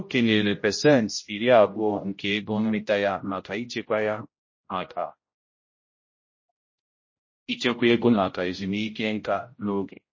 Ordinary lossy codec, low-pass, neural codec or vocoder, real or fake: MP3, 32 kbps; 7.2 kHz; codec, 16 kHz, 0.5 kbps, X-Codec, HuBERT features, trained on general audio; fake